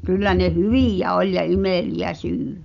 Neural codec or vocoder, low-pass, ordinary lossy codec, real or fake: none; 7.2 kHz; none; real